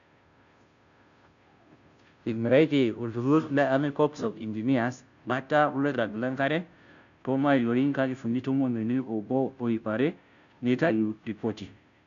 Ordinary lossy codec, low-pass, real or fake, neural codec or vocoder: none; 7.2 kHz; fake; codec, 16 kHz, 0.5 kbps, FunCodec, trained on Chinese and English, 25 frames a second